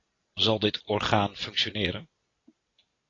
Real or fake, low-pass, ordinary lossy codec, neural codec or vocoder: real; 7.2 kHz; AAC, 32 kbps; none